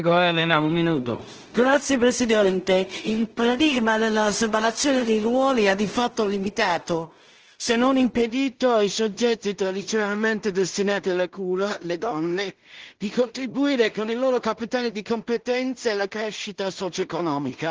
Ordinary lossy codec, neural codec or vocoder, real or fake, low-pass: Opus, 16 kbps; codec, 16 kHz in and 24 kHz out, 0.4 kbps, LongCat-Audio-Codec, two codebook decoder; fake; 7.2 kHz